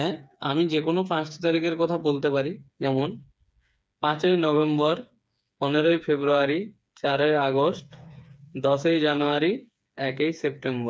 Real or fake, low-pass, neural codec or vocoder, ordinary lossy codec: fake; none; codec, 16 kHz, 4 kbps, FreqCodec, smaller model; none